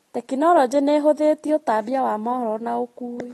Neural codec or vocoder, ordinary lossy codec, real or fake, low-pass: none; AAC, 32 kbps; real; 14.4 kHz